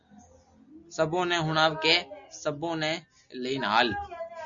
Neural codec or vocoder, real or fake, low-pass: none; real; 7.2 kHz